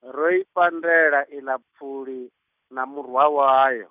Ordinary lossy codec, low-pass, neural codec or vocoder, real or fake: none; 3.6 kHz; none; real